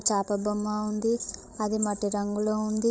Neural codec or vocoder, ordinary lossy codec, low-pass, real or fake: codec, 16 kHz, 16 kbps, FreqCodec, larger model; none; none; fake